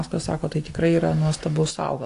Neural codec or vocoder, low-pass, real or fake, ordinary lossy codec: none; 10.8 kHz; real; AAC, 48 kbps